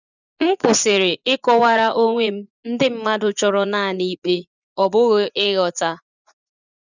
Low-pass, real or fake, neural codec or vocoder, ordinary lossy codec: 7.2 kHz; fake; vocoder, 24 kHz, 100 mel bands, Vocos; none